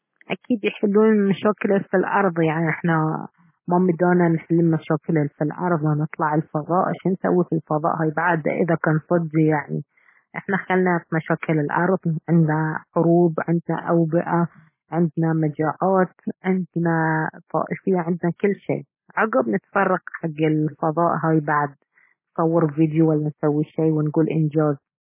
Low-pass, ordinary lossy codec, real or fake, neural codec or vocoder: 3.6 kHz; MP3, 16 kbps; real; none